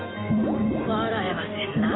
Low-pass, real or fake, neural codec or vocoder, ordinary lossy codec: 7.2 kHz; real; none; AAC, 16 kbps